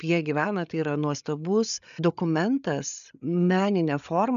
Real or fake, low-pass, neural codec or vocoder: fake; 7.2 kHz; codec, 16 kHz, 8 kbps, FreqCodec, larger model